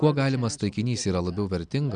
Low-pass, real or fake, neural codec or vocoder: 9.9 kHz; real; none